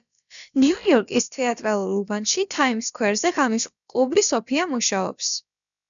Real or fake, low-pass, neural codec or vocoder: fake; 7.2 kHz; codec, 16 kHz, about 1 kbps, DyCAST, with the encoder's durations